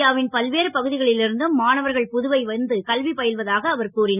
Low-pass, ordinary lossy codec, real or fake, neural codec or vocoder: 3.6 kHz; none; real; none